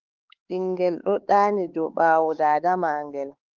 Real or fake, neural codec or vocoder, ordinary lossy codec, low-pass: fake; codec, 16 kHz, 4 kbps, X-Codec, HuBERT features, trained on LibriSpeech; Opus, 16 kbps; 7.2 kHz